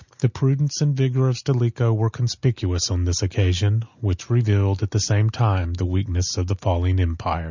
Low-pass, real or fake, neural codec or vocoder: 7.2 kHz; real; none